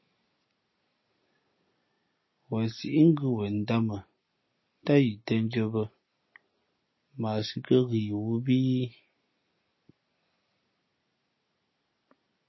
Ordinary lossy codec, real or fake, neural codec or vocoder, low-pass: MP3, 24 kbps; real; none; 7.2 kHz